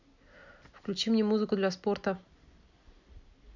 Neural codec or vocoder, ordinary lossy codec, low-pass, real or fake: none; none; 7.2 kHz; real